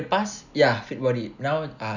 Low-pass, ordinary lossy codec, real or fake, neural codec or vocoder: 7.2 kHz; none; real; none